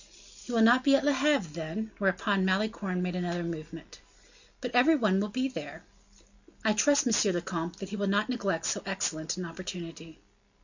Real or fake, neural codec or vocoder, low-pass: real; none; 7.2 kHz